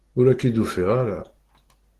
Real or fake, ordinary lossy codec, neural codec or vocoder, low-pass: real; Opus, 16 kbps; none; 14.4 kHz